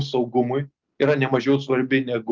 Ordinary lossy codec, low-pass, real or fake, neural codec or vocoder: Opus, 32 kbps; 7.2 kHz; real; none